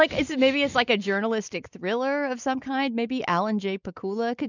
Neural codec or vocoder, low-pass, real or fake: none; 7.2 kHz; real